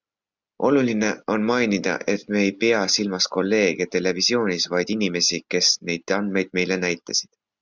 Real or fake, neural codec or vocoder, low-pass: real; none; 7.2 kHz